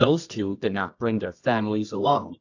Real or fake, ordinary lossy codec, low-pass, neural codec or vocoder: fake; AAC, 48 kbps; 7.2 kHz; codec, 24 kHz, 0.9 kbps, WavTokenizer, medium music audio release